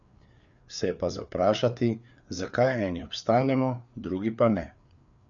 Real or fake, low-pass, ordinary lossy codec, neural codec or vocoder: fake; 7.2 kHz; none; codec, 16 kHz, 4 kbps, FreqCodec, larger model